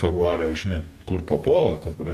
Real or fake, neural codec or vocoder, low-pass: fake; codec, 44.1 kHz, 2.6 kbps, DAC; 14.4 kHz